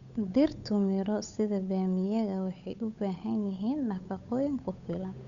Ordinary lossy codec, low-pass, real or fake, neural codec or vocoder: none; 7.2 kHz; fake; codec, 16 kHz, 8 kbps, FunCodec, trained on Chinese and English, 25 frames a second